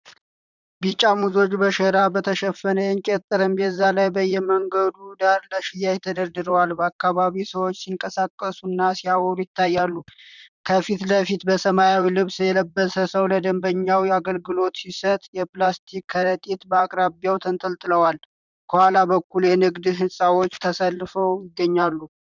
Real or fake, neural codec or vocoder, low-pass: fake; vocoder, 44.1 kHz, 128 mel bands, Pupu-Vocoder; 7.2 kHz